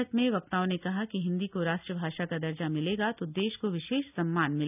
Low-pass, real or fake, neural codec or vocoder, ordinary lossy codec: 3.6 kHz; real; none; none